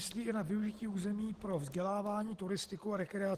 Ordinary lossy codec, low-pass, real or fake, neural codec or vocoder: Opus, 16 kbps; 14.4 kHz; real; none